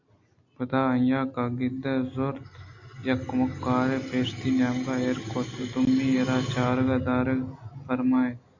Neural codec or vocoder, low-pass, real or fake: none; 7.2 kHz; real